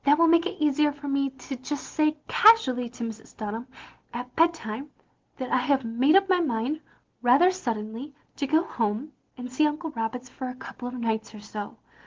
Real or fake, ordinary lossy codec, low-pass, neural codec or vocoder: real; Opus, 16 kbps; 7.2 kHz; none